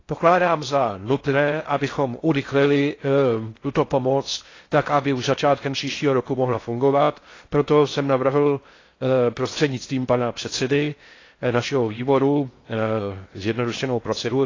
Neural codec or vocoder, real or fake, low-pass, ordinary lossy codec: codec, 16 kHz in and 24 kHz out, 0.6 kbps, FocalCodec, streaming, 4096 codes; fake; 7.2 kHz; AAC, 32 kbps